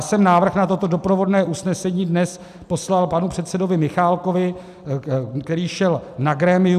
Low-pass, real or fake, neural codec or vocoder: 14.4 kHz; real; none